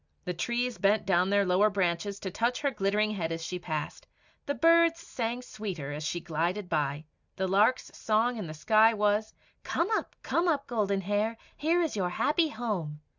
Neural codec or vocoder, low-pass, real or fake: none; 7.2 kHz; real